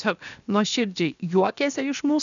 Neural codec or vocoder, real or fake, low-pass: codec, 16 kHz, 0.7 kbps, FocalCodec; fake; 7.2 kHz